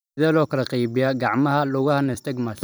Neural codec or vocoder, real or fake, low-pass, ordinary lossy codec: vocoder, 44.1 kHz, 128 mel bands every 256 samples, BigVGAN v2; fake; none; none